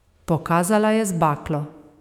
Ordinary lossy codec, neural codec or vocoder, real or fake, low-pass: none; autoencoder, 48 kHz, 128 numbers a frame, DAC-VAE, trained on Japanese speech; fake; 19.8 kHz